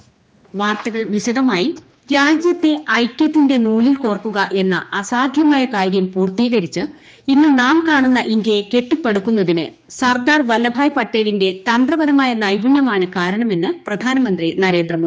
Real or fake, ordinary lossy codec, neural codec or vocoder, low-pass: fake; none; codec, 16 kHz, 2 kbps, X-Codec, HuBERT features, trained on general audio; none